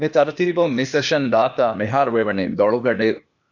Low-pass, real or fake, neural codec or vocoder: 7.2 kHz; fake; codec, 16 kHz, 0.8 kbps, ZipCodec